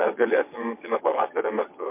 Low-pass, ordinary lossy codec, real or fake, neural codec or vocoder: 3.6 kHz; MP3, 24 kbps; fake; vocoder, 22.05 kHz, 80 mel bands, WaveNeXt